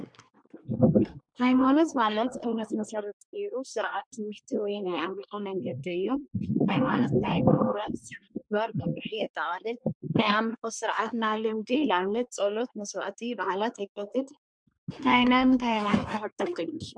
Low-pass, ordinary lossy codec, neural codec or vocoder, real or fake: 9.9 kHz; MP3, 64 kbps; codec, 24 kHz, 1 kbps, SNAC; fake